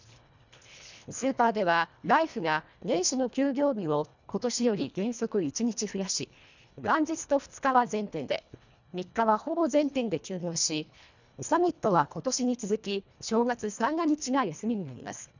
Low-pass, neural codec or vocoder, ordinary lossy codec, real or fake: 7.2 kHz; codec, 24 kHz, 1.5 kbps, HILCodec; none; fake